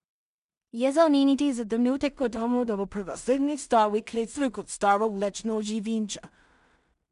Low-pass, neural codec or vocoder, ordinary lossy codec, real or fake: 10.8 kHz; codec, 16 kHz in and 24 kHz out, 0.4 kbps, LongCat-Audio-Codec, two codebook decoder; MP3, 96 kbps; fake